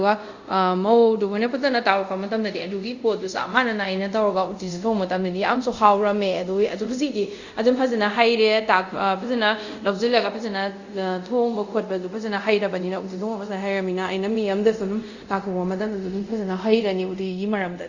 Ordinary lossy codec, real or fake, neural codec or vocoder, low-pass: Opus, 64 kbps; fake; codec, 24 kHz, 0.5 kbps, DualCodec; 7.2 kHz